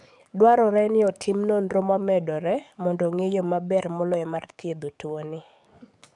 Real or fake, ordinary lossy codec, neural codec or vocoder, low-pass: fake; none; codec, 44.1 kHz, 7.8 kbps, DAC; 10.8 kHz